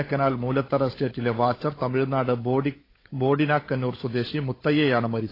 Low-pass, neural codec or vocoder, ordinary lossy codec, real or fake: 5.4 kHz; codec, 16 kHz, 16 kbps, FunCodec, trained on LibriTTS, 50 frames a second; AAC, 24 kbps; fake